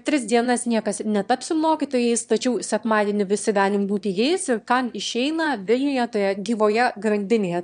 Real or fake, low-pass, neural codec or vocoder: fake; 9.9 kHz; autoencoder, 22.05 kHz, a latent of 192 numbers a frame, VITS, trained on one speaker